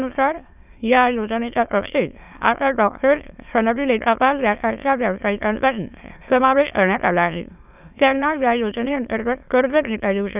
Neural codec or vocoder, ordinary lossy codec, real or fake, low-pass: autoencoder, 22.05 kHz, a latent of 192 numbers a frame, VITS, trained on many speakers; none; fake; 3.6 kHz